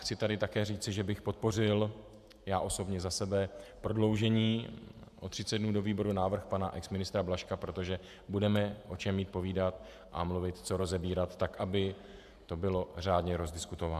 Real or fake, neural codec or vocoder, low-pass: fake; vocoder, 48 kHz, 128 mel bands, Vocos; 14.4 kHz